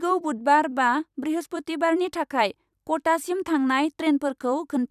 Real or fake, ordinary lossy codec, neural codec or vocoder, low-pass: fake; none; vocoder, 44.1 kHz, 128 mel bands every 256 samples, BigVGAN v2; 14.4 kHz